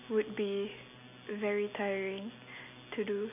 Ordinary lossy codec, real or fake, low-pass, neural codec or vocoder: none; real; 3.6 kHz; none